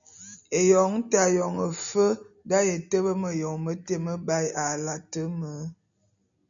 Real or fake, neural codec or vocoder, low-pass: real; none; 7.2 kHz